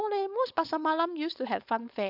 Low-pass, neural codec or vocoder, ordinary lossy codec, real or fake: 5.4 kHz; codec, 16 kHz, 4.8 kbps, FACodec; none; fake